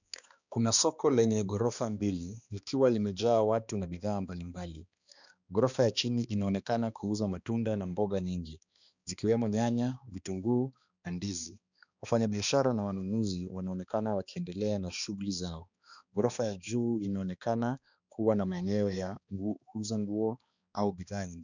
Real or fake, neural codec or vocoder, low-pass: fake; codec, 16 kHz, 2 kbps, X-Codec, HuBERT features, trained on balanced general audio; 7.2 kHz